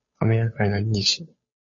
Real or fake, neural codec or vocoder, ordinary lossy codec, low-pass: fake; codec, 16 kHz, 2 kbps, FunCodec, trained on Chinese and English, 25 frames a second; MP3, 32 kbps; 7.2 kHz